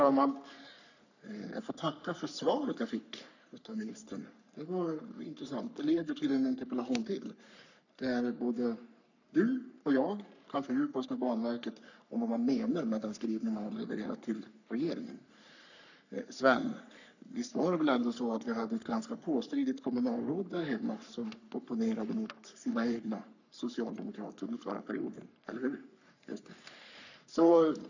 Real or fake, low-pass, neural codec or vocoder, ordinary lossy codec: fake; 7.2 kHz; codec, 44.1 kHz, 3.4 kbps, Pupu-Codec; none